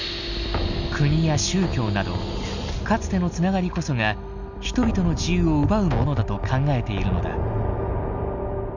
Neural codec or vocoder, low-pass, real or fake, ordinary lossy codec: none; 7.2 kHz; real; none